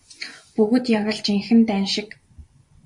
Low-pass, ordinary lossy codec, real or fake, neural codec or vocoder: 10.8 kHz; MP3, 48 kbps; fake; vocoder, 24 kHz, 100 mel bands, Vocos